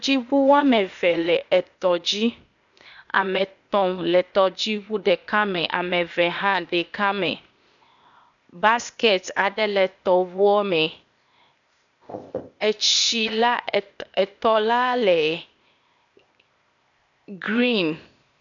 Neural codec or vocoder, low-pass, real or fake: codec, 16 kHz, 0.8 kbps, ZipCodec; 7.2 kHz; fake